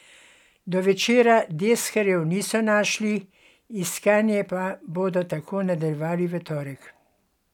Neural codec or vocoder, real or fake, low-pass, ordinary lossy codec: none; real; 19.8 kHz; none